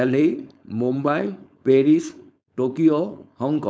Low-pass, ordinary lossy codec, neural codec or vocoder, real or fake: none; none; codec, 16 kHz, 4.8 kbps, FACodec; fake